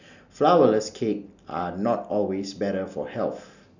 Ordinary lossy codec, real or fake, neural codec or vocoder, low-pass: none; real; none; 7.2 kHz